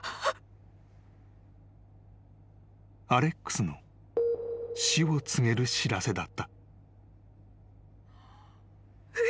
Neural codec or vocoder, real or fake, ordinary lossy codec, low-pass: none; real; none; none